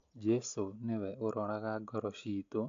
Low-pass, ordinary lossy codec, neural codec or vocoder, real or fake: 7.2 kHz; AAC, 48 kbps; none; real